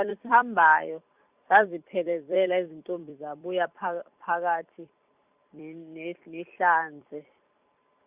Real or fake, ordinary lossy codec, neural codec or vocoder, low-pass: fake; Opus, 64 kbps; vocoder, 44.1 kHz, 128 mel bands every 256 samples, BigVGAN v2; 3.6 kHz